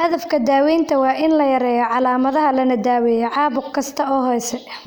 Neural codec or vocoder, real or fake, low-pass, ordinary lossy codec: none; real; none; none